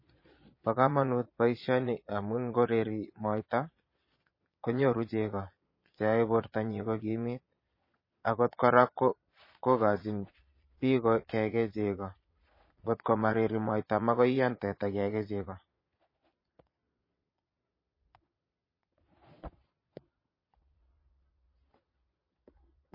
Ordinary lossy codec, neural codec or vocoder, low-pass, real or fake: MP3, 24 kbps; vocoder, 22.05 kHz, 80 mel bands, Vocos; 5.4 kHz; fake